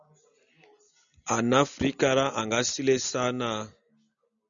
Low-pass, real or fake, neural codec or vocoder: 7.2 kHz; real; none